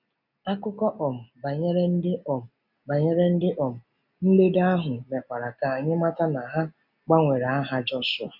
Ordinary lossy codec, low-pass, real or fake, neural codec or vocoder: none; 5.4 kHz; real; none